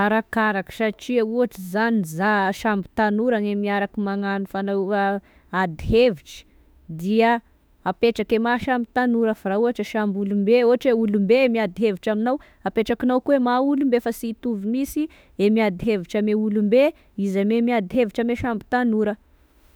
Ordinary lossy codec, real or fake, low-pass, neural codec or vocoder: none; fake; none; autoencoder, 48 kHz, 32 numbers a frame, DAC-VAE, trained on Japanese speech